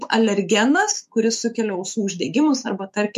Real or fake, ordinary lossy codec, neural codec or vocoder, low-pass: fake; MP3, 64 kbps; autoencoder, 48 kHz, 128 numbers a frame, DAC-VAE, trained on Japanese speech; 14.4 kHz